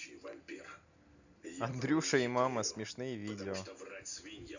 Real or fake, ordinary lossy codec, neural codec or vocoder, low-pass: real; none; none; 7.2 kHz